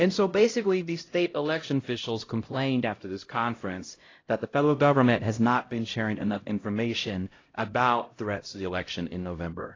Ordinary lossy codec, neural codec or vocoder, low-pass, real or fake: AAC, 32 kbps; codec, 16 kHz, 0.5 kbps, X-Codec, HuBERT features, trained on LibriSpeech; 7.2 kHz; fake